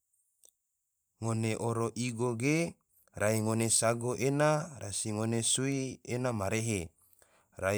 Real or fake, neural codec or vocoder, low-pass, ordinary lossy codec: fake; vocoder, 44.1 kHz, 128 mel bands every 512 samples, BigVGAN v2; none; none